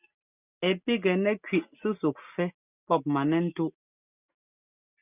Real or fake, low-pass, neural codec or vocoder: real; 3.6 kHz; none